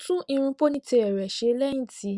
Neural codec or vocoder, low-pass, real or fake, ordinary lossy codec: none; 10.8 kHz; real; Opus, 64 kbps